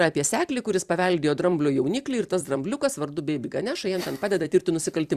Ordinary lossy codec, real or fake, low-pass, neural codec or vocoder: Opus, 64 kbps; fake; 14.4 kHz; vocoder, 44.1 kHz, 128 mel bands every 256 samples, BigVGAN v2